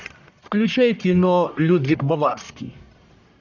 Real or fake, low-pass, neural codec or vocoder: fake; 7.2 kHz; codec, 44.1 kHz, 1.7 kbps, Pupu-Codec